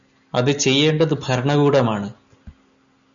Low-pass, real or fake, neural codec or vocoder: 7.2 kHz; real; none